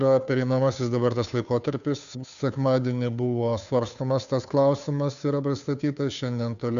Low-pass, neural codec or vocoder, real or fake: 7.2 kHz; codec, 16 kHz, 2 kbps, FunCodec, trained on Chinese and English, 25 frames a second; fake